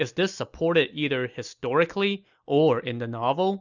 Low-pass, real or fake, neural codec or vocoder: 7.2 kHz; real; none